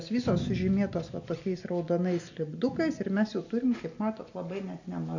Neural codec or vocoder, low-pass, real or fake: none; 7.2 kHz; real